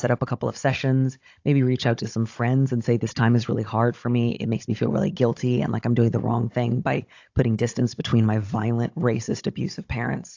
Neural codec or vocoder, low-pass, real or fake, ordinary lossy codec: codec, 16 kHz, 16 kbps, FunCodec, trained on Chinese and English, 50 frames a second; 7.2 kHz; fake; AAC, 48 kbps